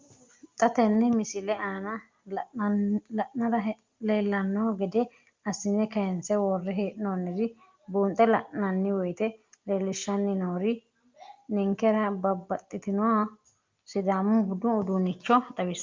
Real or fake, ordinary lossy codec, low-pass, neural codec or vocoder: real; Opus, 32 kbps; 7.2 kHz; none